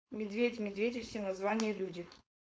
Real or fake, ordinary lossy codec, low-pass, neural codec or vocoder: fake; none; none; codec, 16 kHz, 4.8 kbps, FACodec